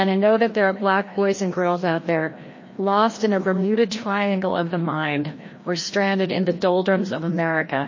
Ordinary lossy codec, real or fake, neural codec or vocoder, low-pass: MP3, 32 kbps; fake; codec, 16 kHz, 1 kbps, FreqCodec, larger model; 7.2 kHz